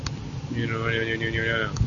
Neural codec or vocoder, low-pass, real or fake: none; 7.2 kHz; real